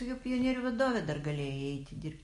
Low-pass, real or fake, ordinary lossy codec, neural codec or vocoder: 14.4 kHz; real; MP3, 48 kbps; none